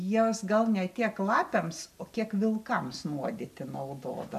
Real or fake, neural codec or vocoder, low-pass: real; none; 14.4 kHz